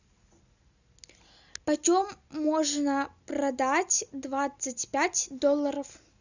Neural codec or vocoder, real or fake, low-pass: none; real; 7.2 kHz